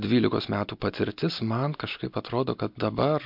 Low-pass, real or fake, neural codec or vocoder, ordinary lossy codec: 5.4 kHz; real; none; MP3, 48 kbps